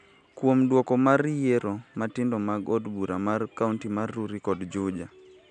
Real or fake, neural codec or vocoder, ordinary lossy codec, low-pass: real; none; none; 9.9 kHz